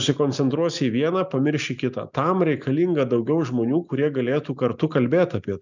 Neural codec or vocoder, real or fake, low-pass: none; real; 7.2 kHz